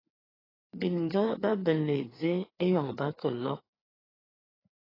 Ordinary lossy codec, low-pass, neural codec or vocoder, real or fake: AAC, 24 kbps; 5.4 kHz; codec, 16 kHz, 4 kbps, FreqCodec, larger model; fake